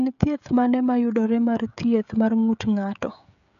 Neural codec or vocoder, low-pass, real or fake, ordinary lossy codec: codec, 16 kHz, 16 kbps, FreqCodec, smaller model; 7.2 kHz; fake; none